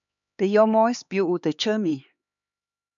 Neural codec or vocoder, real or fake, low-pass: codec, 16 kHz, 4 kbps, X-Codec, HuBERT features, trained on LibriSpeech; fake; 7.2 kHz